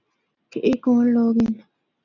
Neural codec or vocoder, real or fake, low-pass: none; real; 7.2 kHz